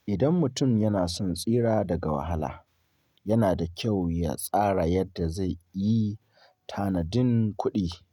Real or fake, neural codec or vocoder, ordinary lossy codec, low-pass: real; none; none; 19.8 kHz